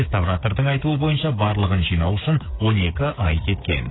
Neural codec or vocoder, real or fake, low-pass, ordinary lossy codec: codec, 16 kHz, 8 kbps, FreqCodec, smaller model; fake; 7.2 kHz; AAC, 16 kbps